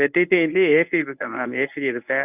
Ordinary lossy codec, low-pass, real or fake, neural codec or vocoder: none; 3.6 kHz; fake; codec, 24 kHz, 0.9 kbps, WavTokenizer, medium speech release version 1